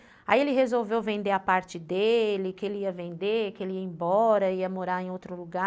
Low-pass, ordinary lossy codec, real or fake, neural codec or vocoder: none; none; real; none